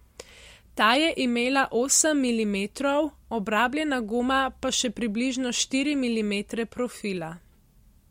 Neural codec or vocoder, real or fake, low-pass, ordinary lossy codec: none; real; 19.8 kHz; MP3, 64 kbps